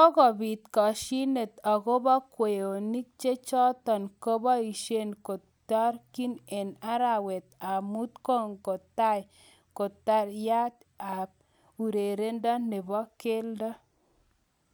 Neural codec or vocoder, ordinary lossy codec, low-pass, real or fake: none; none; none; real